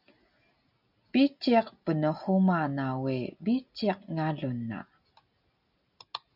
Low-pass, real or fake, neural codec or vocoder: 5.4 kHz; real; none